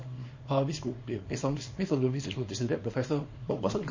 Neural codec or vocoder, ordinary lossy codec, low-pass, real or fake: codec, 24 kHz, 0.9 kbps, WavTokenizer, small release; MP3, 32 kbps; 7.2 kHz; fake